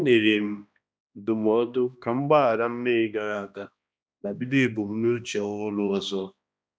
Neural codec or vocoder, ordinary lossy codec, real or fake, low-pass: codec, 16 kHz, 1 kbps, X-Codec, HuBERT features, trained on balanced general audio; none; fake; none